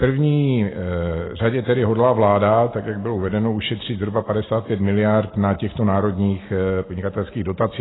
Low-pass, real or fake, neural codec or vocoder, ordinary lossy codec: 7.2 kHz; real; none; AAC, 16 kbps